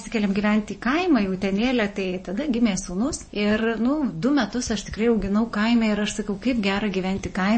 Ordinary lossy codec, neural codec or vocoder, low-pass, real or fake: MP3, 32 kbps; vocoder, 24 kHz, 100 mel bands, Vocos; 9.9 kHz; fake